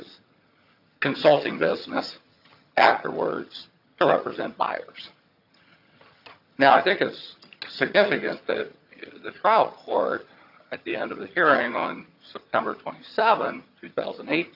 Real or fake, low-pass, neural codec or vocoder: fake; 5.4 kHz; vocoder, 22.05 kHz, 80 mel bands, HiFi-GAN